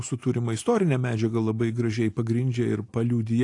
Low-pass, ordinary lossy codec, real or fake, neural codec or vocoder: 10.8 kHz; AAC, 48 kbps; real; none